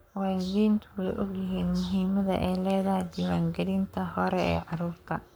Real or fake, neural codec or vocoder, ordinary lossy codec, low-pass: fake; codec, 44.1 kHz, 7.8 kbps, Pupu-Codec; none; none